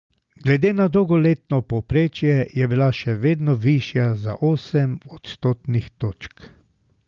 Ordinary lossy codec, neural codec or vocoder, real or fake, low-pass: Opus, 32 kbps; none; real; 7.2 kHz